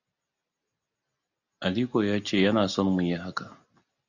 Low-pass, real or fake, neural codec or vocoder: 7.2 kHz; real; none